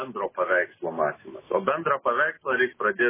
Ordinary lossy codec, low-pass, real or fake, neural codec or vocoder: MP3, 16 kbps; 3.6 kHz; real; none